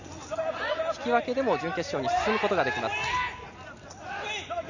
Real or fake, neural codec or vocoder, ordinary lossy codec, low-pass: real; none; none; 7.2 kHz